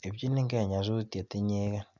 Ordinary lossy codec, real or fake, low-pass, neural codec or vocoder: none; real; 7.2 kHz; none